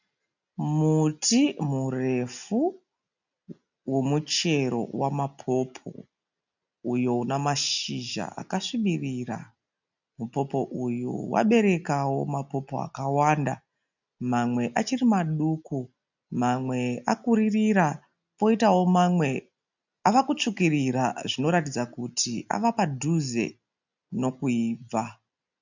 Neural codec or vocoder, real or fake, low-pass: none; real; 7.2 kHz